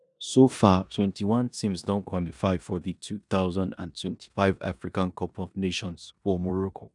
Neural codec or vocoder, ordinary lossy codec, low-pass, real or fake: codec, 16 kHz in and 24 kHz out, 0.9 kbps, LongCat-Audio-Codec, four codebook decoder; none; 10.8 kHz; fake